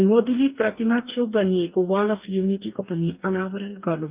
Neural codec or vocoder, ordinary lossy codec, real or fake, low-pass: codec, 44.1 kHz, 2.6 kbps, DAC; Opus, 24 kbps; fake; 3.6 kHz